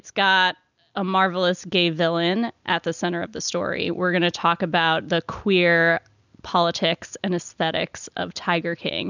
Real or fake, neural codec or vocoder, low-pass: real; none; 7.2 kHz